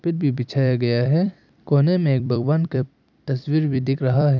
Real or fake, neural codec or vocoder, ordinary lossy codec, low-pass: fake; vocoder, 44.1 kHz, 128 mel bands every 256 samples, BigVGAN v2; none; 7.2 kHz